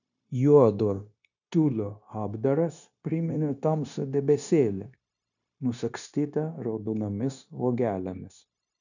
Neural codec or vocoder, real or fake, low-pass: codec, 16 kHz, 0.9 kbps, LongCat-Audio-Codec; fake; 7.2 kHz